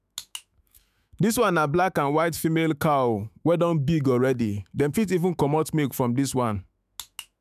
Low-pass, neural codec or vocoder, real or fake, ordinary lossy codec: 14.4 kHz; autoencoder, 48 kHz, 128 numbers a frame, DAC-VAE, trained on Japanese speech; fake; none